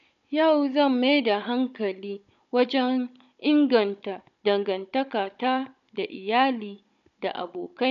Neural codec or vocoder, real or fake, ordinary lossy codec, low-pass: codec, 16 kHz, 16 kbps, FunCodec, trained on Chinese and English, 50 frames a second; fake; none; 7.2 kHz